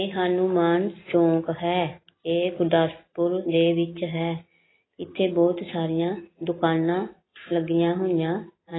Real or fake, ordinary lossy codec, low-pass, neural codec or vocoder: real; AAC, 16 kbps; 7.2 kHz; none